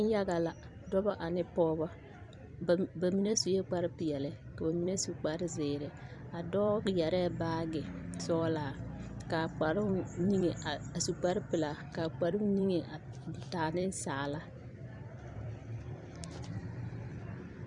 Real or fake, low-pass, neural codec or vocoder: fake; 10.8 kHz; vocoder, 44.1 kHz, 128 mel bands every 256 samples, BigVGAN v2